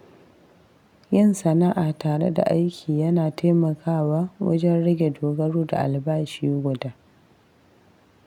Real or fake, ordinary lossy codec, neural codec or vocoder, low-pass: real; none; none; 19.8 kHz